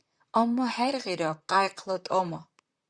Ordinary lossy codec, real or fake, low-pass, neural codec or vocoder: Opus, 64 kbps; fake; 9.9 kHz; vocoder, 44.1 kHz, 128 mel bands, Pupu-Vocoder